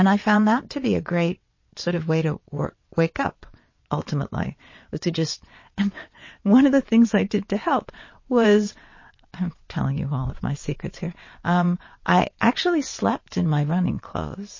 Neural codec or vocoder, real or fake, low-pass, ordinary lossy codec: vocoder, 22.05 kHz, 80 mel bands, WaveNeXt; fake; 7.2 kHz; MP3, 32 kbps